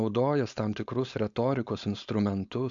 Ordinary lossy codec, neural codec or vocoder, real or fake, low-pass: AAC, 64 kbps; codec, 16 kHz, 4.8 kbps, FACodec; fake; 7.2 kHz